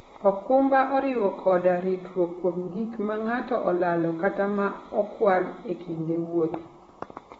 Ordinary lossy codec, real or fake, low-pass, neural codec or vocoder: AAC, 24 kbps; fake; 19.8 kHz; vocoder, 44.1 kHz, 128 mel bands, Pupu-Vocoder